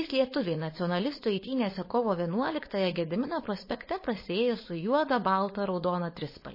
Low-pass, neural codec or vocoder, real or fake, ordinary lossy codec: 5.4 kHz; codec, 16 kHz, 4.8 kbps, FACodec; fake; MP3, 24 kbps